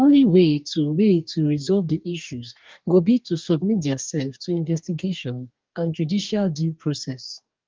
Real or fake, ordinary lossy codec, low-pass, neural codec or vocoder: fake; Opus, 24 kbps; 7.2 kHz; codec, 44.1 kHz, 2.6 kbps, DAC